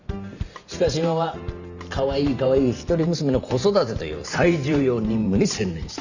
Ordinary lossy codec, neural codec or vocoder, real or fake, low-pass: none; vocoder, 44.1 kHz, 128 mel bands every 512 samples, BigVGAN v2; fake; 7.2 kHz